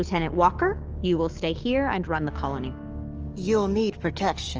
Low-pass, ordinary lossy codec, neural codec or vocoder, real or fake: 7.2 kHz; Opus, 24 kbps; codec, 44.1 kHz, 7.8 kbps, DAC; fake